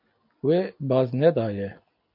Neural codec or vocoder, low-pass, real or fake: none; 5.4 kHz; real